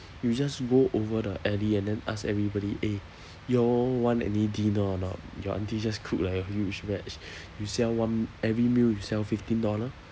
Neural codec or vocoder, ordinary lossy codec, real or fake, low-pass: none; none; real; none